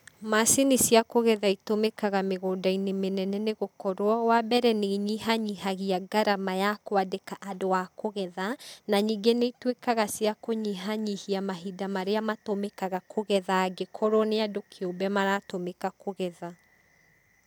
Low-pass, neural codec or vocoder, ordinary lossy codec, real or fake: none; none; none; real